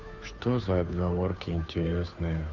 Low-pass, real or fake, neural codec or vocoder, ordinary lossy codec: 7.2 kHz; fake; codec, 16 kHz, 2 kbps, FunCodec, trained on Chinese and English, 25 frames a second; none